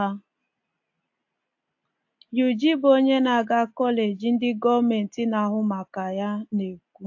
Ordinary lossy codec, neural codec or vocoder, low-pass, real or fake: AAC, 48 kbps; none; 7.2 kHz; real